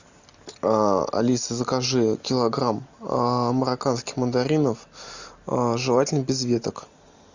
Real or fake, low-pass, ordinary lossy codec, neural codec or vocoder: real; 7.2 kHz; Opus, 64 kbps; none